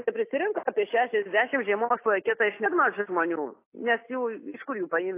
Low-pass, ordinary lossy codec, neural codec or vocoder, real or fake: 3.6 kHz; AAC, 24 kbps; none; real